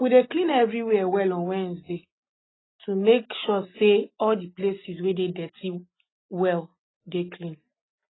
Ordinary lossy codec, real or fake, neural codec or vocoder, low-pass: AAC, 16 kbps; real; none; 7.2 kHz